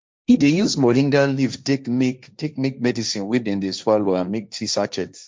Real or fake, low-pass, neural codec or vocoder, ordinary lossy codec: fake; none; codec, 16 kHz, 1.1 kbps, Voila-Tokenizer; none